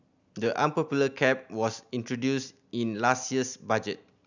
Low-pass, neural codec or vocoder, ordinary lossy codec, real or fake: 7.2 kHz; none; none; real